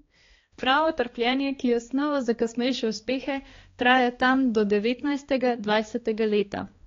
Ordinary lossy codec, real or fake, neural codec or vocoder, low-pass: AAC, 32 kbps; fake; codec, 16 kHz, 2 kbps, X-Codec, HuBERT features, trained on balanced general audio; 7.2 kHz